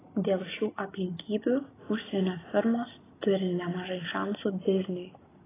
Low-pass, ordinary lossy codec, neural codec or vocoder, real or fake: 3.6 kHz; AAC, 16 kbps; none; real